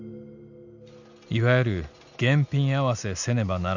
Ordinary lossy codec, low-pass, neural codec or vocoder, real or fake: none; 7.2 kHz; none; real